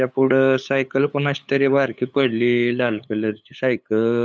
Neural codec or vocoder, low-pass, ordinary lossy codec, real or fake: codec, 16 kHz, 8 kbps, FunCodec, trained on LibriTTS, 25 frames a second; none; none; fake